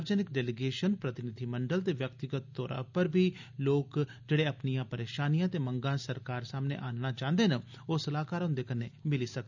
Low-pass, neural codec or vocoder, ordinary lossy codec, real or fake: 7.2 kHz; none; none; real